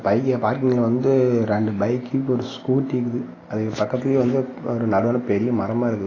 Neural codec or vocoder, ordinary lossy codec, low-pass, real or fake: none; AAC, 32 kbps; 7.2 kHz; real